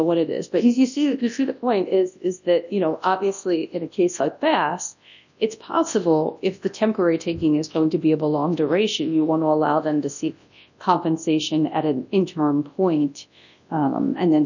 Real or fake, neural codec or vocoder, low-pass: fake; codec, 24 kHz, 0.9 kbps, WavTokenizer, large speech release; 7.2 kHz